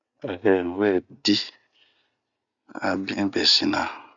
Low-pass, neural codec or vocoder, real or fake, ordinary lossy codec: 7.2 kHz; none; real; none